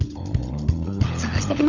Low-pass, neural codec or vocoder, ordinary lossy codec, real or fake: 7.2 kHz; codec, 16 kHz, 16 kbps, FunCodec, trained on LibriTTS, 50 frames a second; none; fake